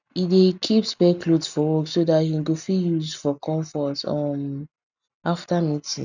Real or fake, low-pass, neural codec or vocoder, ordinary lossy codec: real; 7.2 kHz; none; none